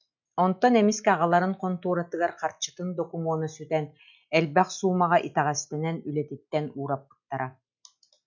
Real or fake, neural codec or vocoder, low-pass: real; none; 7.2 kHz